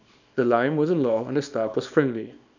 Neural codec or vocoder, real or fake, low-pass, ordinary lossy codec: codec, 24 kHz, 0.9 kbps, WavTokenizer, small release; fake; 7.2 kHz; none